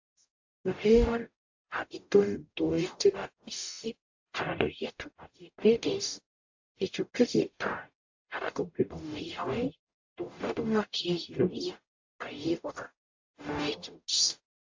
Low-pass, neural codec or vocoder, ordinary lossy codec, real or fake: 7.2 kHz; codec, 44.1 kHz, 0.9 kbps, DAC; AAC, 48 kbps; fake